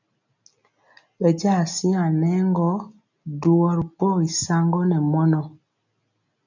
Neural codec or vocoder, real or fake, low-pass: none; real; 7.2 kHz